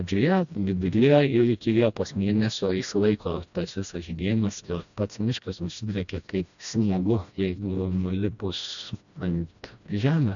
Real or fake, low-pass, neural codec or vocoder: fake; 7.2 kHz; codec, 16 kHz, 1 kbps, FreqCodec, smaller model